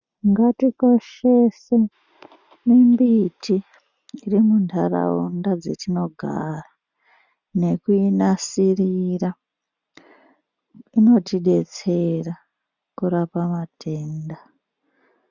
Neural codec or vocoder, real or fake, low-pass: none; real; 7.2 kHz